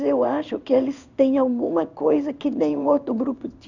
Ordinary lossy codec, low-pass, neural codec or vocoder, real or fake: none; 7.2 kHz; codec, 16 kHz in and 24 kHz out, 1 kbps, XY-Tokenizer; fake